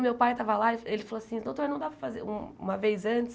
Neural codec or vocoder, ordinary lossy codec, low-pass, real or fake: none; none; none; real